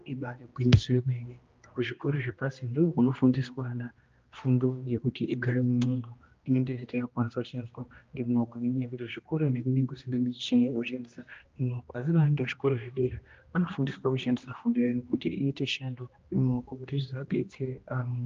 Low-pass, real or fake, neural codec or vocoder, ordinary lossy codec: 7.2 kHz; fake; codec, 16 kHz, 1 kbps, X-Codec, HuBERT features, trained on general audio; Opus, 24 kbps